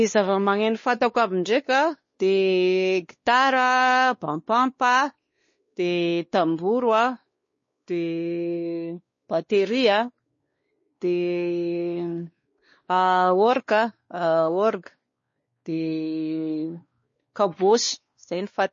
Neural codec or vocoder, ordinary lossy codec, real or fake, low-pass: codec, 16 kHz, 2 kbps, X-Codec, WavLM features, trained on Multilingual LibriSpeech; MP3, 32 kbps; fake; 7.2 kHz